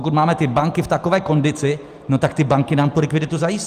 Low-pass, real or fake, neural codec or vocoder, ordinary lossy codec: 14.4 kHz; real; none; Opus, 32 kbps